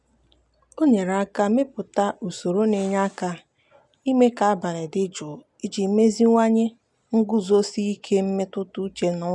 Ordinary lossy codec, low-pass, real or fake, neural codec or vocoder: none; 10.8 kHz; real; none